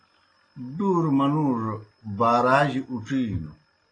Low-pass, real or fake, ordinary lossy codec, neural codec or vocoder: 9.9 kHz; real; AAC, 32 kbps; none